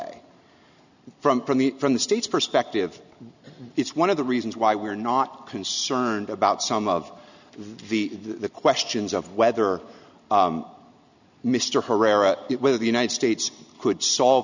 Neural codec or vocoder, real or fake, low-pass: none; real; 7.2 kHz